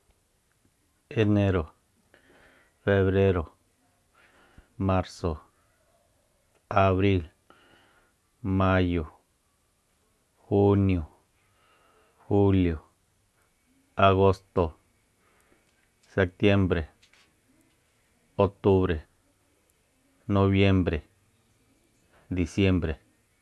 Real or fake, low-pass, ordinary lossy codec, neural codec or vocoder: real; none; none; none